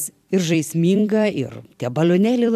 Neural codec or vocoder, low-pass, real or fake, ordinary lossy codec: vocoder, 44.1 kHz, 128 mel bands every 256 samples, BigVGAN v2; 14.4 kHz; fake; MP3, 96 kbps